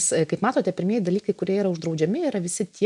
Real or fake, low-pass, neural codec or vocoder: real; 10.8 kHz; none